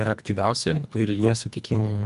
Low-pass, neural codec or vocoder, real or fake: 10.8 kHz; codec, 24 kHz, 1.5 kbps, HILCodec; fake